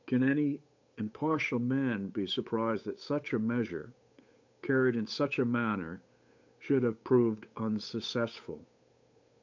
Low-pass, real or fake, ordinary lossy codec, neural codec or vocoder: 7.2 kHz; fake; MP3, 64 kbps; codec, 16 kHz, 8 kbps, FunCodec, trained on Chinese and English, 25 frames a second